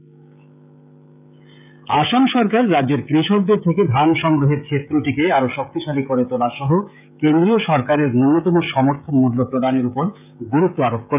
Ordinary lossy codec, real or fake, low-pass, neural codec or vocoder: none; fake; 3.6 kHz; codec, 16 kHz, 16 kbps, FreqCodec, smaller model